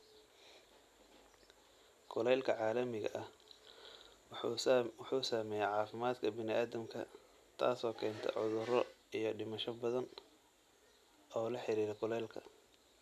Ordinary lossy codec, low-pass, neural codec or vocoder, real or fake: none; 14.4 kHz; none; real